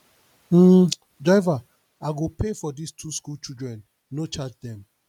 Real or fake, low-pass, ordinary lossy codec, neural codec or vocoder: real; 19.8 kHz; none; none